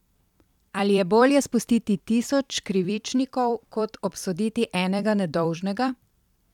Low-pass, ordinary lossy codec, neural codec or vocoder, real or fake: 19.8 kHz; none; vocoder, 44.1 kHz, 128 mel bands every 256 samples, BigVGAN v2; fake